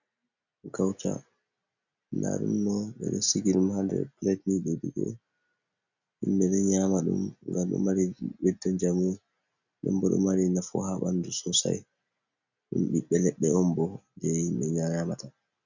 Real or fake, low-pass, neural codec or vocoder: real; 7.2 kHz; none